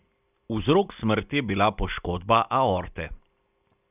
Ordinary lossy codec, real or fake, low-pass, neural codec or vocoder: none; real; 3.6 kHz; none